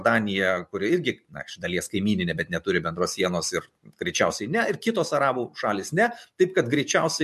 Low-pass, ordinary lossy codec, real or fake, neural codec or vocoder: 14.4 kHz; MP3, 64 kbps; real; none